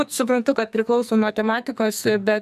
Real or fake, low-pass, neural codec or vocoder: fake; 14.4 kHz; codec, 44.1 kHz, 2.6 kbps, SNAC